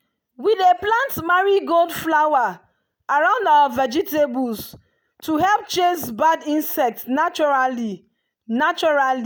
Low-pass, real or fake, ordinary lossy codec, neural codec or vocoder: none; real; none; none